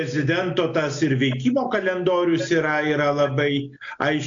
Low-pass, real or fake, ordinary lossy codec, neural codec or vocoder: 7.2 kHz; real; AAC, 64 kbps; none